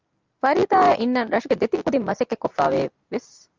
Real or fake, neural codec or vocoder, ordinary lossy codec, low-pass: real; none; Opus, 32 kbps; 7.2 kHz